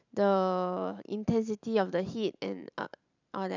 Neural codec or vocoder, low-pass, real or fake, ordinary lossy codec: vocoder, 44.1 kHz, 128 mel bands every 512 samples, BigVGAN v2; 7.2 kHz; fake; none